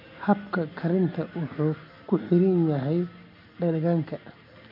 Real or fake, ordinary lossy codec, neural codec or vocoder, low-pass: real; MP3, 32 kbps; none; 5.4 kHz